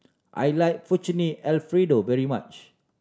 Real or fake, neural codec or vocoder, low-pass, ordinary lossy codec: real; none; none; none